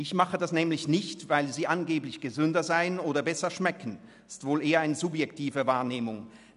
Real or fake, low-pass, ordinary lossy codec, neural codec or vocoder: real; 10.8 kHz; none; none